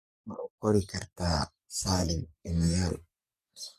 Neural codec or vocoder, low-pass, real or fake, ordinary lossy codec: codec, 44.1 kHz, 3.4 kbps, Pupu-Codec; 14.4 kHz; fake; MP3, 96 kbps